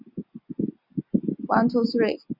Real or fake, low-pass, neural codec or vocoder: real; 5.4 kHz; none